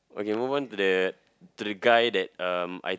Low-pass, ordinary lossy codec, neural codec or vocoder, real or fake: none; none; none; real